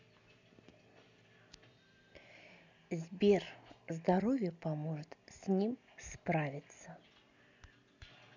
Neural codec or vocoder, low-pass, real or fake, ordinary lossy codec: none; 7.2 kHz; real; none